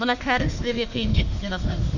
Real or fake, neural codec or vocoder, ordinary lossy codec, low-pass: fake; autoencoder, 48 kHz, 32 numbers a frame, DAC-VAE, trained on Japanese speech; none; 7.2 kHz